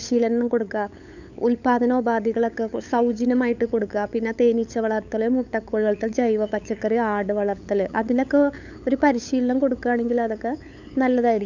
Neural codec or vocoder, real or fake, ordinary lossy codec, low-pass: codec, 16 kHz, 8 kbps, FunCodec, trained on LibriTTS, 25 frames a second; fake; none; 7.2 kHz